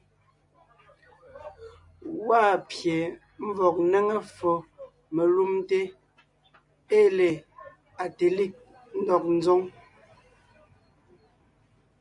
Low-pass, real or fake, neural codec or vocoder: 10.8 kHz; real; none